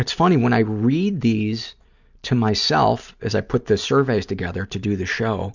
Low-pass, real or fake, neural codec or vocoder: 7.2 kHz; real; none